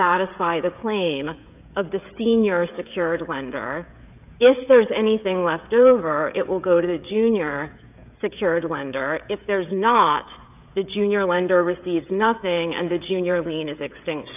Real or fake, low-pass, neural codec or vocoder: fake; 3.6 kHz; codec, 16 kHz, 16 kbps, FreqCodec, smaller model